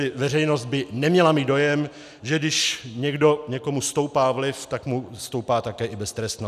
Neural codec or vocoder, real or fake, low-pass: none; real; 14.4 kHz